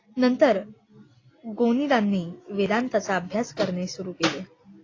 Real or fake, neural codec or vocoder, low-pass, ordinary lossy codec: real; none; 7.2 kHz; AAC, 32 kbps